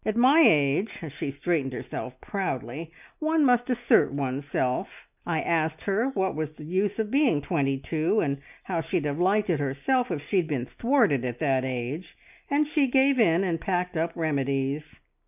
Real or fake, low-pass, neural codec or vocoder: real; 3.6 kHz; none